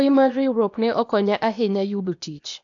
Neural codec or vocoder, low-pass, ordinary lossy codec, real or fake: codec, 16 kHz, 0.8 kbps, ZipCodec; 7.2 kHz; AAC, 64 kbps; fake